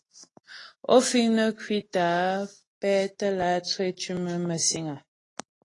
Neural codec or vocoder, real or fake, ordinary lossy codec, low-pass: none; real; AAC, 32 kbps; 10.8 kHz